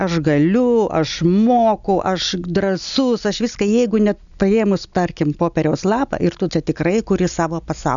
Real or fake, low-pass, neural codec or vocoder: real; 7.2 kHz; none